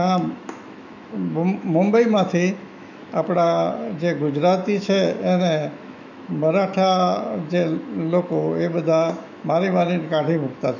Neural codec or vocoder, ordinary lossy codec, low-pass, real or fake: autoencoder, 48 kHz, 128 numbers a frame, DAC-VAE, trained on Japanese speech; none; 7.2 kHz; fake